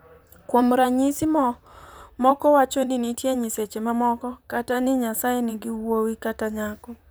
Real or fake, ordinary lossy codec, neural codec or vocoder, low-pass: fake; none; vocoder, 44.1 kHz, 128 mel bands, Pupu-Vocoder; none